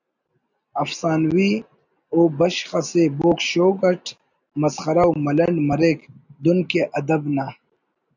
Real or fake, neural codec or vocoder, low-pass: real; none; 7.2 kHz